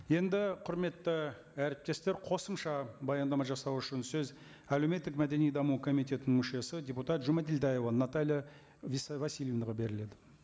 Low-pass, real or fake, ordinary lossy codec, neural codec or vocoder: none; real; none; none